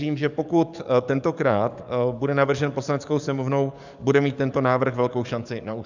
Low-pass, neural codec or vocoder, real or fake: 7.2 kHz; codec, 44.1 kHz, 7.8 kbps, DAC; fake